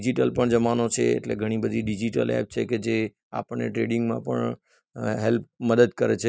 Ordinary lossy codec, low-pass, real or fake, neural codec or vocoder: none; none; real; none